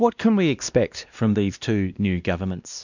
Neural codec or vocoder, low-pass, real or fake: codec, 16 kHz, 1 kbps, X-Codec, WavLM features, trained on Multilingual LibriSpeech; 7.2 kHz; fake